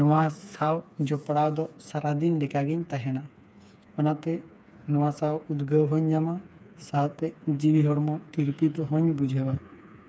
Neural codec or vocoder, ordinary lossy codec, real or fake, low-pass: codec, 16 kHz, 4 kbps, FreqCodec, smaller model; none; fake; none